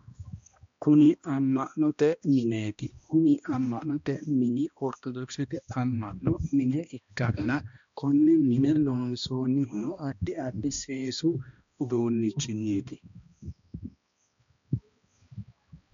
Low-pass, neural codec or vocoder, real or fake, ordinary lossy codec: 7.2 kHz; codec, 16 kHz, 1 kbps, X-Codec, HuBERT features, trained on balanced general audio; fake; MP3, 64 kbps